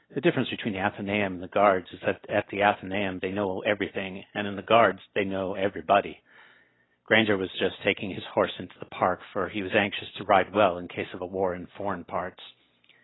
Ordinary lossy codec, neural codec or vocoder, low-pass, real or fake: AAC, 16 kbps; none; 7.2 kHz; real